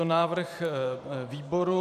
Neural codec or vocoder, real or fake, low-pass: vocoder, 44.1 kHz, 128 mel bands every 512 samples, BigVGAN v2; fake; 14.4 kHz